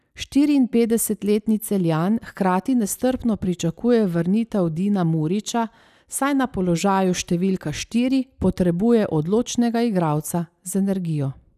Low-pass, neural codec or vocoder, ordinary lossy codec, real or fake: 14.4 kHz; none; none; real